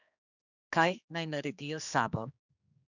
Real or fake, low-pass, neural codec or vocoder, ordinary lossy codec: fake; 7.2 kHz; codec, 16 kHz, 2 kbps, X-Codec, HuBERT features, trained on general audio; none